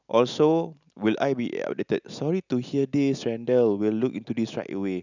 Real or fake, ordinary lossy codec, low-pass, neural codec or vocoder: real; none; 7.2 kHz; none